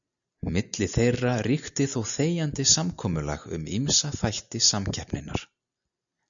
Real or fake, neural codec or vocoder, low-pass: real; none; 7.2 kHz